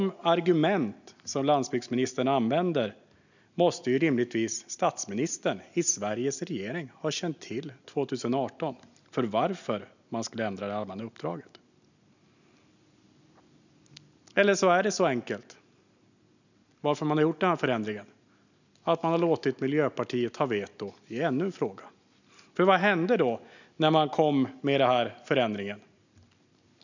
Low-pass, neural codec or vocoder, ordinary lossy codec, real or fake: 7.2 kHz; none; none; real